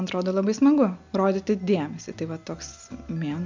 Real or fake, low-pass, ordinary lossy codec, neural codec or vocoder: real; 7.2 kHz; MP3, 64 kbps; none